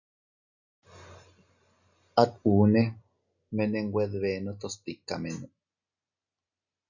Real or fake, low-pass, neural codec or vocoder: real; 7.2 kHz; none